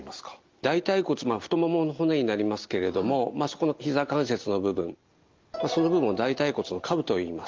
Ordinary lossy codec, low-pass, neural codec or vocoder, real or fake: Opus, 32 kbps; 7.2 kHz; none; real